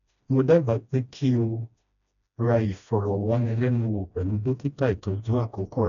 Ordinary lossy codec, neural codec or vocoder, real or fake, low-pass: none; codec, 16 kHz, 1 kbps, FreqCodec, smaller model; fake; 7.2 kHz